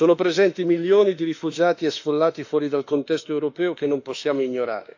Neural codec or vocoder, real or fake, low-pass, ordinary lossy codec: autoencoder, 48 kHz, 32 numbers a frame, DAC-VAE, trained on Japanese speech; fake; 7.2 kHz; AAC, 48 kbps